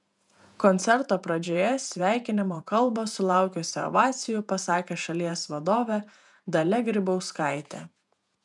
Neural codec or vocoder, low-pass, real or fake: vocoder, 48 kHz, 128 mel bands, Vocos; 10.8 kHz; fake